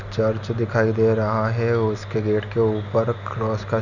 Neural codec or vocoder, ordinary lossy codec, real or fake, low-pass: none; none; real; 7.2 kHz